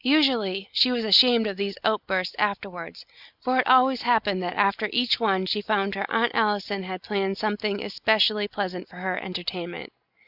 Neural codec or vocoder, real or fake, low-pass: none; real; 5.4 kHz